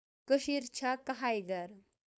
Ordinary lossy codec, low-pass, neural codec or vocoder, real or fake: none; none; codec, 16 kHz, 4.8 kbps, FACodec; fake